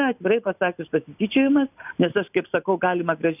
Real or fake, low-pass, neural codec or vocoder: real; 3.6 kHz; none